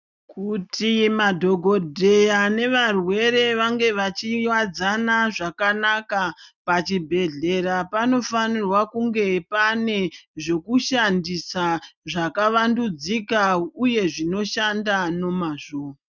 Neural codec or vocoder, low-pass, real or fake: none; 7.2 kHz; real